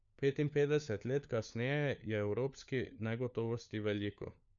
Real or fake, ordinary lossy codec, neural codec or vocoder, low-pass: fake; none; codec, 16 kHz, 4 kbps, FunCodec, trained on LibriTTS, 50 frames a second; 7.2 kHz